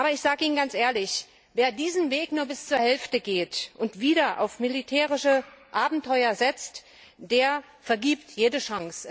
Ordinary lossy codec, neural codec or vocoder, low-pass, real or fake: none; none; none; real